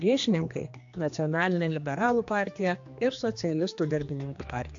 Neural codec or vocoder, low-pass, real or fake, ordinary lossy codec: codec, 16 kHz, 2 kbps, X-Codec, HuBERT features, trained on general audio; 7.2 kHz; fake; AAC, 64 kbps